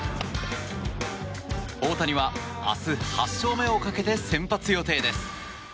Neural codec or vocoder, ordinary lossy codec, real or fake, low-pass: none; none; real; none